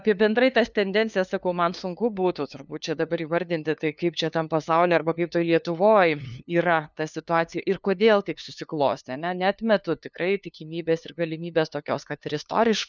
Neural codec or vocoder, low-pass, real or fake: codec, 16 kHz, 2 kbps, FunCodec, trained on LibriTTS, 25 frames a second; 7.2 kHz; fake